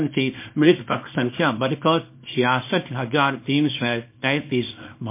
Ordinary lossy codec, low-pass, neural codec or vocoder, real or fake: MP3, 24 kbps; 3.6 kHz; codec, 24 kHz, 0.9 kbps, WavTokenizer, small release; fake